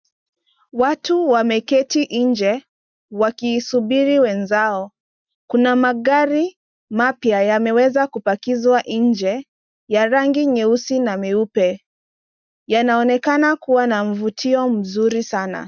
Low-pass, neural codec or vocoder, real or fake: 7.2 kHz; none; real